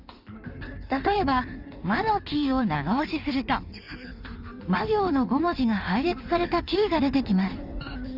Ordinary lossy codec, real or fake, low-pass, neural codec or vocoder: none; fake; 5.4 kHz; codec, 16 kHz in and 24 kHz out, 1.1 kbps, FireRedTTS-2 codec